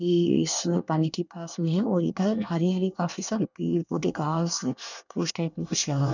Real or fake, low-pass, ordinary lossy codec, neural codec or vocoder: fake; 7.2 kHz; none; codec, 24 kHz, 1 kbps, SNAC